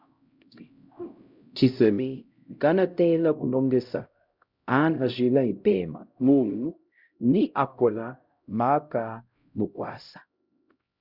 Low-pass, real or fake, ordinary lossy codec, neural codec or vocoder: 5.4 kHz; fake; Opus, 64 kbps; codec, 16 kHz, 0.5 kbps, X-Codec, HuBERT features, trained on LibriSpeech